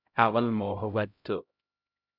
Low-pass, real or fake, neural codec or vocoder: 5.4 kHz; fake; codec, 16 kHz, 0.5 kbps, X-Codec, HuBERT features, trained on LibriSpeech